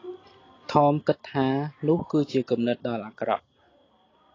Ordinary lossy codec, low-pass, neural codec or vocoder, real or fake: AAC, 32 kbps; 7.2 kHz; none; real